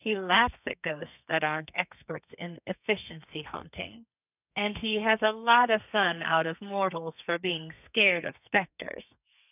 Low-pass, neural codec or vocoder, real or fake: 3.6 kHz; codec, 32 kHz, 1.9 kbps, SNAC; fake